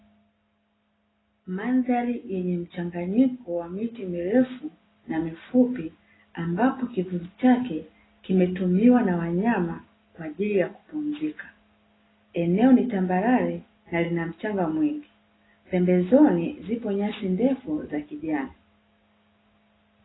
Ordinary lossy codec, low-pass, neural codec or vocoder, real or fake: AAC, 16 kbps; 7.2 kHz; none; real